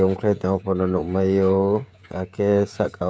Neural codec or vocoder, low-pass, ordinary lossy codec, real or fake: codec, 16 kHz, 16 kbps, FreqCodec, smaller model; none; none; fake